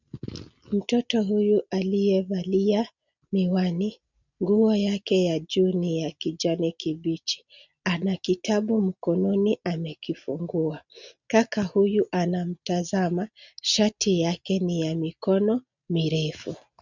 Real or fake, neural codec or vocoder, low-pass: real; none; 7.2 kHz